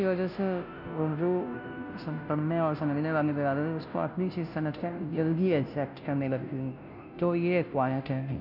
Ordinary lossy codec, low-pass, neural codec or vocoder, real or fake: none; 5.4 kHz; codec, 16 kHz, 0.5 kbps, FunCodec, trained on Chinese and English, 25 frames a second; fake